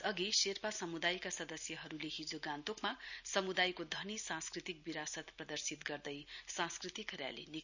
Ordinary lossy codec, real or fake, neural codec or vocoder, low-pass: none; real; none; 7.2 kHz